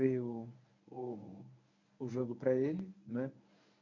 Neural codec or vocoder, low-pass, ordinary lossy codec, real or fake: codec, 24 kHz, 0.9 kbps, WavTokenizer, medium speech release version 1; 7.2 kHz; MP3, 48 kbps; fake